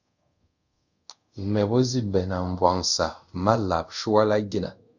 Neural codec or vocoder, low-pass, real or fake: codec, 24 kHz, 0.5 kbps, DualCodec; 7.2 kHz; fake